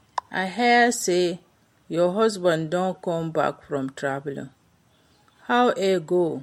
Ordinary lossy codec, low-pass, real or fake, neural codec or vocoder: MP3, 64 kbps; 19.8 kHz; real; none